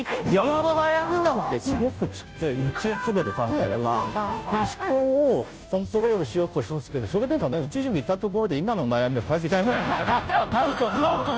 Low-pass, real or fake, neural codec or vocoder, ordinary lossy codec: none; fake; codec, 16 kHz, 0.5 kbps, FunCodec, trained on Chinese and English, 25 frames a second; none